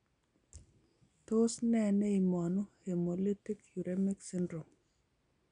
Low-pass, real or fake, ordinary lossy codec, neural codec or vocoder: 9.9 kHz; real; none; none